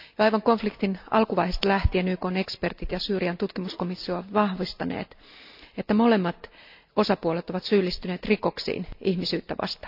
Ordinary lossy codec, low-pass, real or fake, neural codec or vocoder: AAC, 48 kbps; 5.4 kHz; real; none